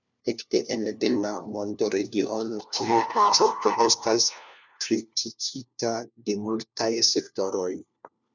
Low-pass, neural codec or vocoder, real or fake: 7.2 kHz; codec, 16 kHz, 1 kbps, FunCodec, trained on LibriTTS, 50 frames a second; fake